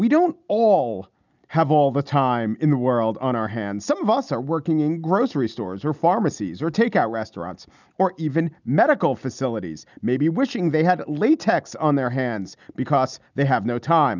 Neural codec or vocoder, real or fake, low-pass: none; real; 7.2 kHz